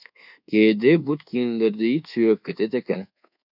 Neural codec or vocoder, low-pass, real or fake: codec, 24 kHz, 1.2 kbps, DualCodec; 5.4 kHz; fake